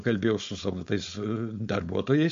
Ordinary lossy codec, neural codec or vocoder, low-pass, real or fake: MP3, 48 kbps; codec, 16 kHz, 4.8 kbps, FACodec; 7.2 kHz; fake